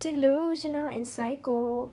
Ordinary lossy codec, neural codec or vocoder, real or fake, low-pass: none; codec, 24 kHz, 1 kbps, SNAC; fake; 10.8 kHz